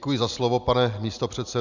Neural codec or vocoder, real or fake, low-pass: none; real; 7.2 kHz